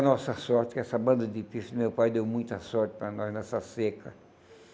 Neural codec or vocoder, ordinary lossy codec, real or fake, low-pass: none; none; real; none